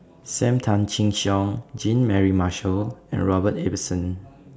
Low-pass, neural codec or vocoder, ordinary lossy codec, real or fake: none; none; none; real